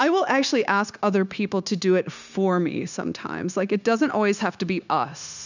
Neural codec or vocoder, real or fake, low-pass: codec, 16 kHz, 0.9 kbps, LongCat-Audio-Codec; fake; 7.2 kHz